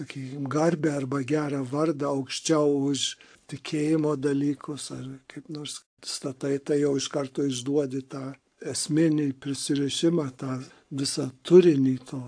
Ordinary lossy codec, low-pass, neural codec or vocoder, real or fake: AAC, 64 kbps; 9.9 kHz; codec, 44.1 kHz, 7.8 kbps, Pupu-Codec; fake